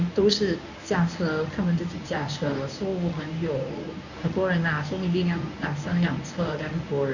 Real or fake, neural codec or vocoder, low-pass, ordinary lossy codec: fake; codec, 24 kHz, 0.9 kbps, WavTokenizer, medium speech release version 1; 7.2 kHz; none